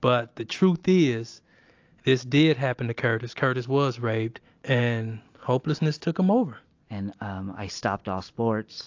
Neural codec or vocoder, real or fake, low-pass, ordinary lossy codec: none; real; 7.2 kHz; AAC, 48 kbps